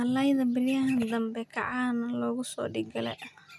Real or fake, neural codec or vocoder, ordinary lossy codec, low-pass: real; none; none; none